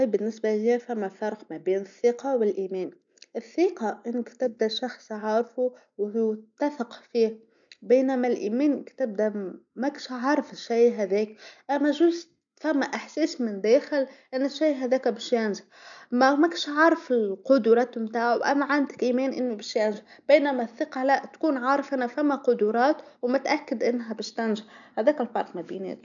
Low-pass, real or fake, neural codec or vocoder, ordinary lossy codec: 7.2 kHz; real; none; none